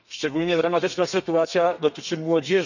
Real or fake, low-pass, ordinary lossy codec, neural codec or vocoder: fake; 7.2 kHz; none; codec, 32 kHz, 1.9 kbps, SNAC